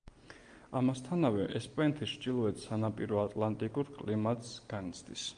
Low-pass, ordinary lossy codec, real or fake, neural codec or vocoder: 9.9 kHz; Opus, 32 kbps; real; none